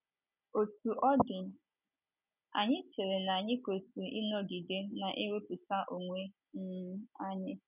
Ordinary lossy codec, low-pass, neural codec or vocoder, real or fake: none; 3.6 kHz; none; real